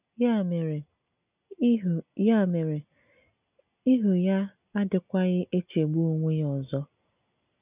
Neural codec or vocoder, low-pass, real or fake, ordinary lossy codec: none; 3.6 kHz; real; none